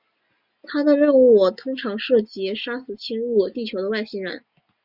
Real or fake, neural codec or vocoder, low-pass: real; none; 5.4 kHz